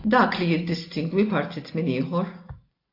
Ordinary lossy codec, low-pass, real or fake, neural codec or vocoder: AAC, 32 kbps; 5.4 kHz; real; none